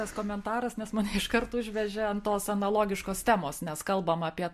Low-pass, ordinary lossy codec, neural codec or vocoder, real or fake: 14.4 kHz; MP3, 64 kbps; none; real